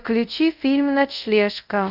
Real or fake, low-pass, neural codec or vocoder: fake; 5.4 kHz; codec, 24 kHz, 0.5 kbps, DualCodec